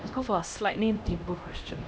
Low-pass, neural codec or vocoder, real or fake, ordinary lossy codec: none; codec, 16 kHz, 1 kbps, X-Codec, HuBERT features, trained on LibriSpeech; fake; none